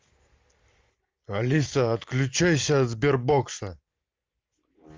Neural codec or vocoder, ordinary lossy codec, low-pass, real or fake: none; Opus, 32 kbps; 7.2 kHz; real